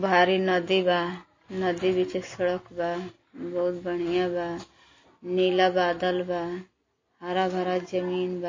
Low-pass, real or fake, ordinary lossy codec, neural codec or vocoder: 7.2 kHz; real; MP3, 32 kbps; none